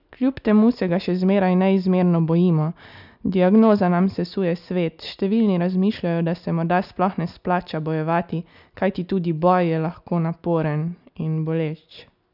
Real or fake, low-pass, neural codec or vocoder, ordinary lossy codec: real; 5.4 kHz; none; none